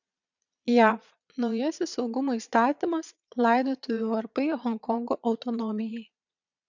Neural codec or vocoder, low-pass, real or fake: vocoder, 22.05 kHz, 80 mel bands, Vocos; 7.2 kHz; fake